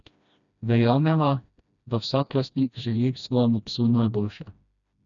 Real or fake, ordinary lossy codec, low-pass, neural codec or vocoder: fake; none; 7.2 kHz; codec, 16 kHz, 1 kbps, FreqCodec, smaller model